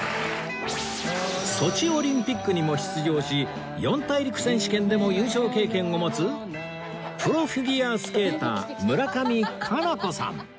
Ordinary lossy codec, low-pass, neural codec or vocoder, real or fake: none; none; none; real